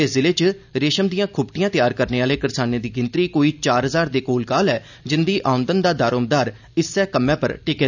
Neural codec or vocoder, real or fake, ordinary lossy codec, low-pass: none; real; none; 7.2 kHz